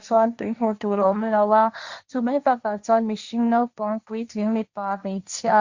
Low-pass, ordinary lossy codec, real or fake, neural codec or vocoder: 7.2 kHz; Opus, 64 kbps; fake; codec, 16 kHz, 1.1 kbps, Voila-Tokenizer